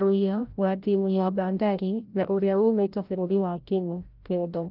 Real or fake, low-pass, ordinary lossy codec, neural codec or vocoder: fake; 5.4 kHz; Opus, 32 kbps; codec, 16 kHz, 0.5 kbps, FreqCodec, larger model